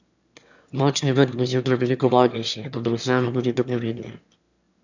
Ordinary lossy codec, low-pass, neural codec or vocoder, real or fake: none; 7.2 kHz; autoencoder, 22.05 kHz, a latent of 192 numbers a frame, VITS, trained on one speaker; fake